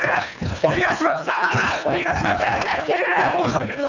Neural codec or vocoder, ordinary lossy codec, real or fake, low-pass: codec, 24 kHz, 1.5 kbps, HILCodec; none; fake; 7.2 kHz